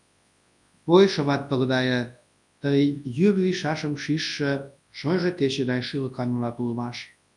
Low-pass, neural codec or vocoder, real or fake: 10.8 kHz; codec, 24 kHz, 0.9 kbps, WavTokenizer, large speech release; fake